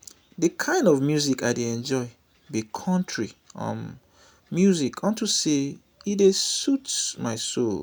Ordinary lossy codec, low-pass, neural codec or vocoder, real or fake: none; none; none; real